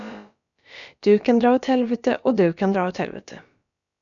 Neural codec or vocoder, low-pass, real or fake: codec, 16 kHz, about 1 kbps, DyCAST, with the encoder's durations; 7.2 kHz; fake